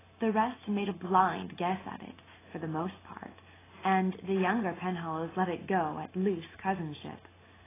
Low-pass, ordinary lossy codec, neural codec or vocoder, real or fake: 3.6 kHz; AAC, 16 kbps; none; real